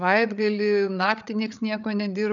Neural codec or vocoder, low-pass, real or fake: codec, 16 kHz, 8 kbps, FreqCodec, larger model; 7.2 kHz; fake